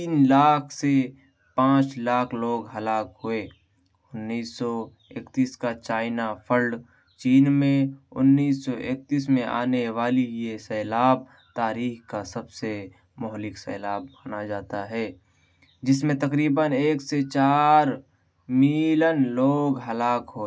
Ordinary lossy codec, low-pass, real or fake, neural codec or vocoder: none; none; real; none